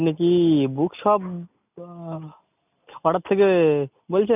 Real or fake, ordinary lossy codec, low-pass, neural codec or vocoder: real; none; 3.6 kHz; none